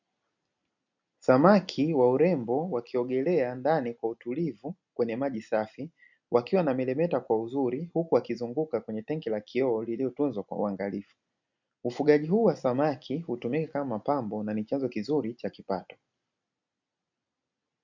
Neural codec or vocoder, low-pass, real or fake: none; 7.2 kHz; real